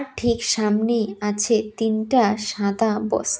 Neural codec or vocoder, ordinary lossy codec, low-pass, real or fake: none; none; none; real